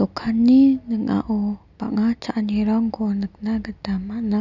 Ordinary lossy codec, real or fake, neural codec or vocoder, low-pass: none; real; none; 7.2 kHz